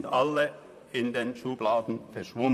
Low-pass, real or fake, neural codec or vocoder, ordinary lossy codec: 14.4 kHz; fake; vocoder, 44.1 kHz, 128 mel bands, Pupu-Vocoder; none